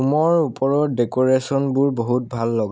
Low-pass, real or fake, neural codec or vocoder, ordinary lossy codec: none; real; none; none